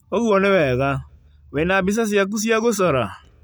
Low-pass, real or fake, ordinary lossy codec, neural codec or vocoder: none; real; none; none